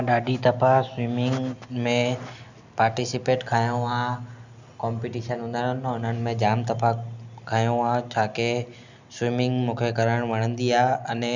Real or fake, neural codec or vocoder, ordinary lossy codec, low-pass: real; none; none; 7.2 kHz